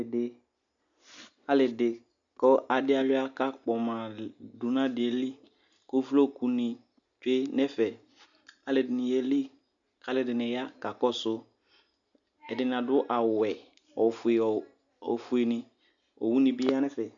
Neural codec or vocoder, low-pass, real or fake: none; 7.2 kHz; real